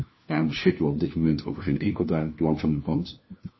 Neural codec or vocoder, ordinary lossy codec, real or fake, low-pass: codec, 16 kHz, 1 kbps, FunCodec, trained on LibriTTS, 50 frames a second; MP3, 24 kbps; fake; 7.2 kHz